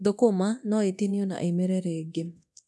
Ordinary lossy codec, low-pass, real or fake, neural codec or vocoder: none; none; fake; codec, 24 kHz, 0.9 kbps, DualCodec